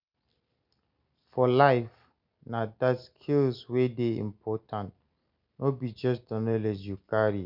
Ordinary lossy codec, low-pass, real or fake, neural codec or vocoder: none; 5.4 kHz; real; none